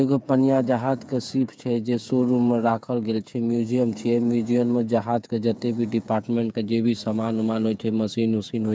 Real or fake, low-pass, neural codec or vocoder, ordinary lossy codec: fake; none; codec, 16 kHz, 8 kbps, FreqCodec, smaller model; none